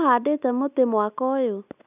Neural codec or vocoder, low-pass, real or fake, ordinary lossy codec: none; 3.6 kHz; real; none